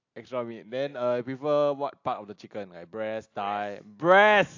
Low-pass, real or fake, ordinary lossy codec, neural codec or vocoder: 7.2 kHz; real; AAC, 48 kbps; none